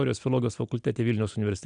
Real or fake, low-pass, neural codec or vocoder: real; 9.9 kHz; none